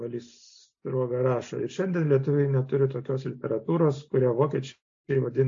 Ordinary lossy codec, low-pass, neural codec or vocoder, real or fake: AAC, 48 kbps; 7.2 kHz; none; real